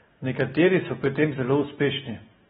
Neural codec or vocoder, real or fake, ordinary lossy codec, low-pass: none; real; AAC, 16 kbps; 19.8 kHz